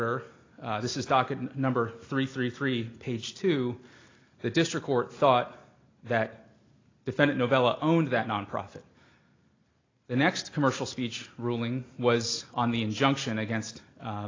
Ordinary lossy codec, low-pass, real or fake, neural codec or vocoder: AAC, 32 kbps; 7.2 kHz; real; none